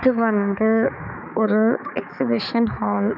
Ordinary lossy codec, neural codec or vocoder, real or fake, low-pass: none; codec, 16 kHz, 4 kbps, X-Codec, HuBERT features, trained on balanced general audio; fake; 5.4 kHz